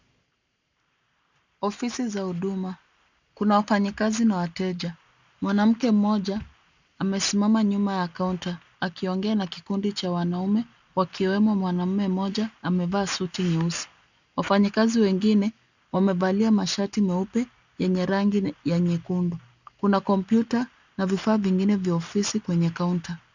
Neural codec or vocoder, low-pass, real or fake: none; 7.2 kHz; real